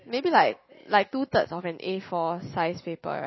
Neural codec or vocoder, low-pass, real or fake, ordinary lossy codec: none; 7.2 kHz; real; MP3, 24 kbps